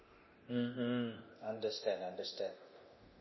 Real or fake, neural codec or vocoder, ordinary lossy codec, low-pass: fake; codec, 24 kHz, 0.9 kbps, DualCodec; MP3, 24 kbps; 7.2 kHz